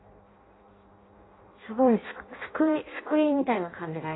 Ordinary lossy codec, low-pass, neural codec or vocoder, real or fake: AAC, 16 kbps; 7.2 kHz; codec, 16 kHz in and 24 kHz out, 0.6 kbps, FireRedTTS-2 codec; fake